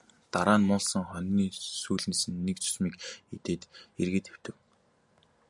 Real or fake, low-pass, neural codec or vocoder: real; 10.8 kHz; none